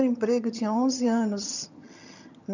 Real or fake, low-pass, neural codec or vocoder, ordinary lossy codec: fake; 7.2 kHz; vocoder, 22.05 kHz, 80 mel bands, HiFi-GAN; none